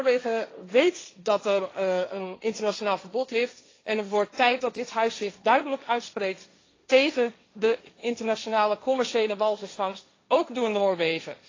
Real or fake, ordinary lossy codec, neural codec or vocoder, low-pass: fake; AAC, 32 kbps; codec, 16 kHz, 1.1 kbps, Voila-Tokenizer; 7.2 kHz